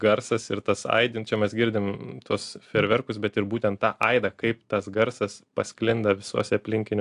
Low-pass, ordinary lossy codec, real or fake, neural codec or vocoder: 10.8 kHz; AAC, 64 kbps; real; none